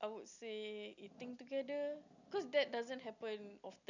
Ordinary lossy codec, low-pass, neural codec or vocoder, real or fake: none; 7.2 kHz; none; real